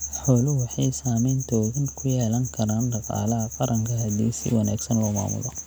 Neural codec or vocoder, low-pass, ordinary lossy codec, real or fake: none; none; none; real